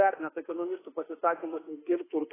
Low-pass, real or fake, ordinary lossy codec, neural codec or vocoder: 3.6 kHz; fake; AAC, 16 kbps; autoencoder, 48 kHz, 32 numbers a frame, DAC-VAE, trained on Japanese speech